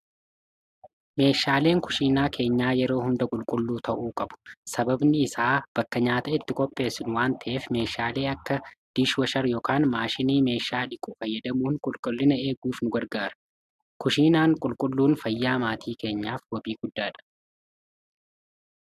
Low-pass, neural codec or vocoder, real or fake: 14.4 kHz; none; real